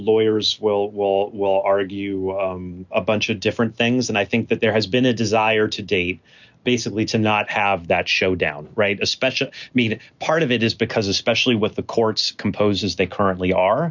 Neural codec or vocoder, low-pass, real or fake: none; 7.2 kHz; real